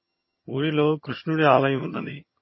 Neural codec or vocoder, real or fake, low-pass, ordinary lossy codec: vocoder, 22.05 kHz, 80 mel bands, HiFi-GAN; fake; 7.2 kHz; MP3, 24 kbps